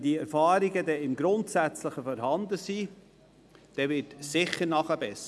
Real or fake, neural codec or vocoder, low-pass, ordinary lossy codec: real; none; none; none